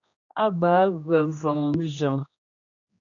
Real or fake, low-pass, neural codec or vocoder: fake; 7.2 kHz; codec, 16 kHz, 1 kbps, X-Codec, HuBERT features, trained on general audio